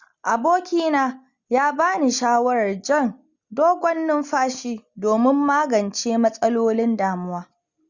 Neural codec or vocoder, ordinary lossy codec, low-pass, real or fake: none; Opus, 64 kbps; 7.2 kHz; real